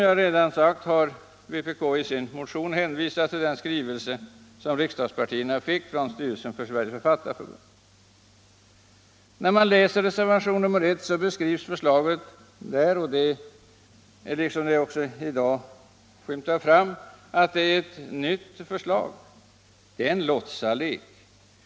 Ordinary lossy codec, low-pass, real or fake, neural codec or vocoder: none; none; real; none